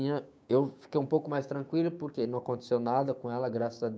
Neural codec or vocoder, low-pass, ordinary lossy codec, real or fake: codec, 16 kHz, 6 kbps, DAC; none; none; fake